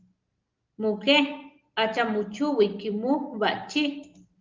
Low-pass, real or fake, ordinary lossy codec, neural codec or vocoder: 7.2 kHz; real; Opus, 32 kbps; none